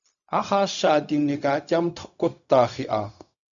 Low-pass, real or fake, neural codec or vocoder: 7.2 kHz; fake; codec, 16 kHz, 0.4 kbps, LongCat-Audio-Codec